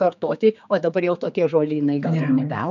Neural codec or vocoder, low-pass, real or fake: codec, 16 kHz, 4 kbps, X-Codec, HuBERT features, trained on general audio; 7.2 kHz; fake